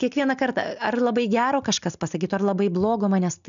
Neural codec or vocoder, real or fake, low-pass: none; real; 7.2 kHz